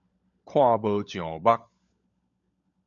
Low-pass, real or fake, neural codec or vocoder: 7.2 kHz; fake; codec, 16 kHz, 16 kbps, FunCodec, trained on LibriTTS, 50 frames a second